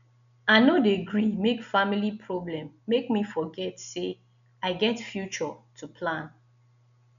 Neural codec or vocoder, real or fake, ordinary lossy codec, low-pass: none; real; none; 7.2 kHz